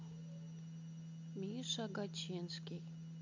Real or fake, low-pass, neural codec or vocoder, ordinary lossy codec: real; 7.2 kHz; none; MP3, 48 kbps